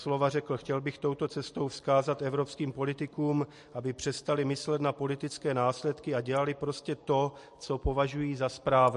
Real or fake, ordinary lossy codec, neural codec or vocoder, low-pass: real; MP3, 48 kbps; none; 14.4 kHz